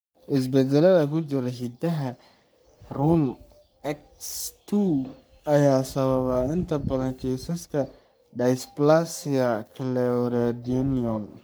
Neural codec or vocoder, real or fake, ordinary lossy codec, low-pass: codec, 44.1 kHz, 3.4 kbps, Pupu-Codec; fake; none; none